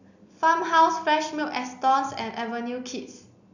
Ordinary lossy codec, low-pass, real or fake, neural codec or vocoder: none; 7.2 kHz; real; none